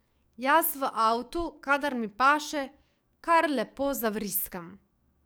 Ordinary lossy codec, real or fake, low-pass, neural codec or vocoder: none; fake; none; codec, 44.1 kHz, 7.8 kbps, DAC